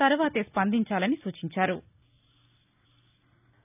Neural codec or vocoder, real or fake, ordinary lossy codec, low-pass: none; real; none; 3.6 kHz